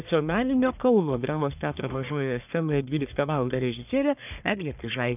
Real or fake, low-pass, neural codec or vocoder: fake; 3.6 kHz; codec, 44.1 kHz, 1.7 kbps, Pupu-Codec